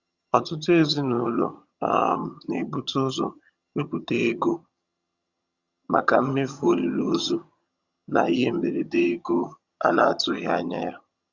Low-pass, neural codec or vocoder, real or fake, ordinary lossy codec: 7.2 kHz; vocoder, 22.05 kHz, 80 mel bands, HiFi-GAN; fake; Opus, 64 kbps